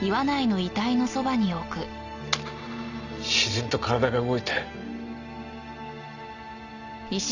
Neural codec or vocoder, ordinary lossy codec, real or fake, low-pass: none; none; real; 7.2 kHz